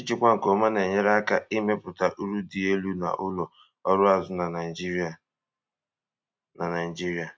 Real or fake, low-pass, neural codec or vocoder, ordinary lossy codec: real; none; none; none